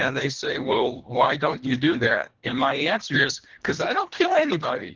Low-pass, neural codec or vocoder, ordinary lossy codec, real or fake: 7.2 kHz; codec, 24 kHz, 1.5 kbps, HILCodec; Opus, 16 kbps; fake